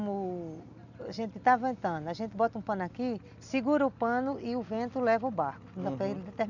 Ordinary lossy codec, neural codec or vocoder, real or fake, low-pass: none; none; real; 7.2 kHz